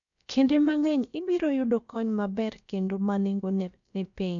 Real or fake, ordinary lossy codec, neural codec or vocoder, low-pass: fake; none; codec, 16 kHz, about 1 kbps, DyCAST, with the encoder's durations; 7.2 kHz